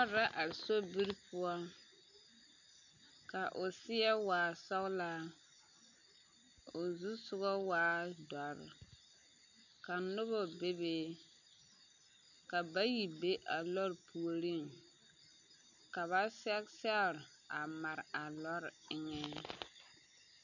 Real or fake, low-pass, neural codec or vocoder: real; 7.2 kHz; none